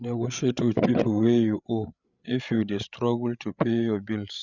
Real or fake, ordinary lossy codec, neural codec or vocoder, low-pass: fake; none; codec, 16 kHz, 8 kbps, FreqCodec, larger model; 7.2 kHz